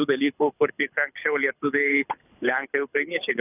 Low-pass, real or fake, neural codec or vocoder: 3.6 kHz; fake; codec, 24 kHz, 6 kbps, HILCodec